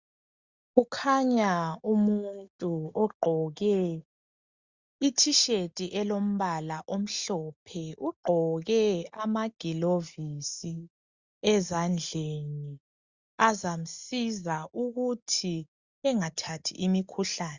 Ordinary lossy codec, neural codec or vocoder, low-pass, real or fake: Opus, 64 kbps; none; 7.2 kHz; real